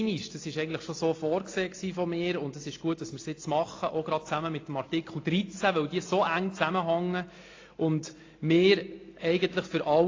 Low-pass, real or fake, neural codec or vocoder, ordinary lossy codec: 7.2 kHz; real; none; AAC, 32 kbps